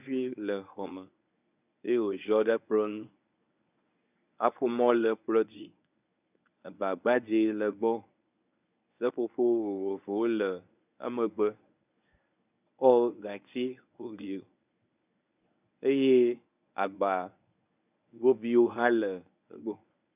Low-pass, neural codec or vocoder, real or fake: 3.6 kHz; codec, 24 kHz, 0.9 kbps, WavTokenizer, medium speech release version 1; fake